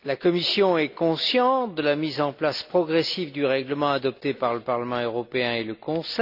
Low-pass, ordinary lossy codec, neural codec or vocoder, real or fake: 5.4 kHz; none; none; real